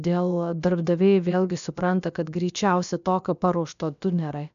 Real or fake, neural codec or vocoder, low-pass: fake; codec, 16 kHz, about 1 kbps, DyCAST, with the encoder's durations; 7.2 kHz